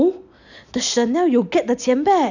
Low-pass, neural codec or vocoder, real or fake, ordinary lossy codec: 7.2 kHz; none; real; none